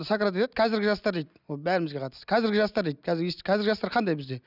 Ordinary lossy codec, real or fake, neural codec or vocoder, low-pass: none; real; none; 5.4 kHz